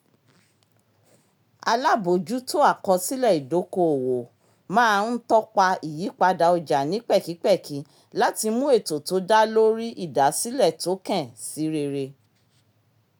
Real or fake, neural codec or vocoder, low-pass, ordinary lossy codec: real; none; none; none